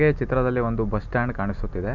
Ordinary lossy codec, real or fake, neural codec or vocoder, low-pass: none; real; none; 7.2 kHz